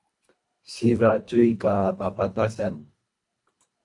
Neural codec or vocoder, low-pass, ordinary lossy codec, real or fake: codec, 24 kHz, 1.5 kbps, HILCodec; 10.8 kHz; AAC, 64 kbps; fake